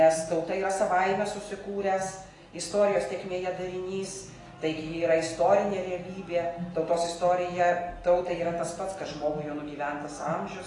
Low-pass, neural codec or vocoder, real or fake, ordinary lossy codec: 10.8 kHz; none; real; AAC, 32 kbps